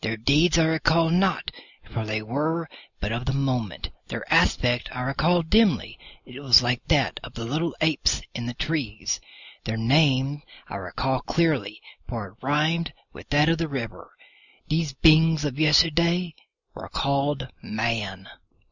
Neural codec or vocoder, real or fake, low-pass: none; real; 7.2 kHz